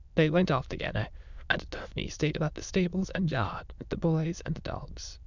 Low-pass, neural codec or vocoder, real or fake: 7.2 kHz; autoencoder, 22.05 kHz, a latent of 192 numbers a frame, VITS, trained on many speakers; fake